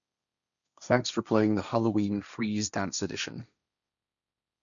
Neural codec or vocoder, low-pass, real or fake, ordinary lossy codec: codec, 16 kHz, 1.1 kbps, Voila-Tokenizer; 7.2 kHz; fake; none